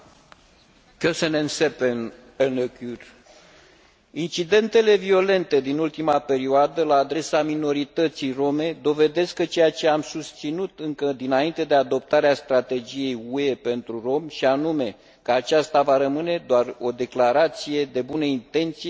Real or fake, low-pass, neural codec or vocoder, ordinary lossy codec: real; none; none; none